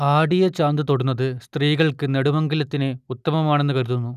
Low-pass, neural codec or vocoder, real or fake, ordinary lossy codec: 14.4 kHz; none; real; none